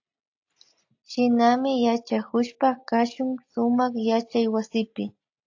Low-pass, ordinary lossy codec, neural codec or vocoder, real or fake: 7.2 kHz; AAC, 48 kbps; none; real